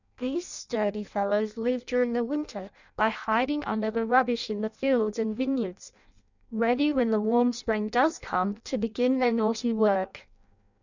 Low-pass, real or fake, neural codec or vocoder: 7.2 kHz; fake; codec, 16 kHz in and 24 kHz out, 0.6 kbps, FireRedTTS-2 codec